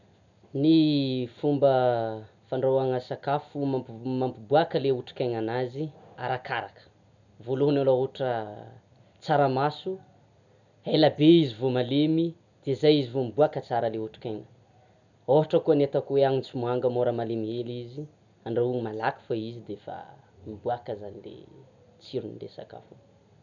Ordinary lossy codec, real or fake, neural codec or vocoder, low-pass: none; real; none; 7.2 kHz